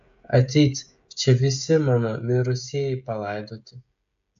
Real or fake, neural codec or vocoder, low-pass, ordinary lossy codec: fake; codec, 16 kHz, 16 kbps, FreqCodec, smaller model; 7.2 kHz; AAC, 64 kbps